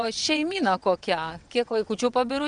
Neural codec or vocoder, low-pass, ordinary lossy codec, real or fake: vocoder, 22.05 kHz, 80 mel bands, WaveNeXt; 9.9 kHz; Opus, 64 kbps; fake